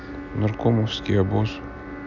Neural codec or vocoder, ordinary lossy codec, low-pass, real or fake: none; none; 7.2 kHz; real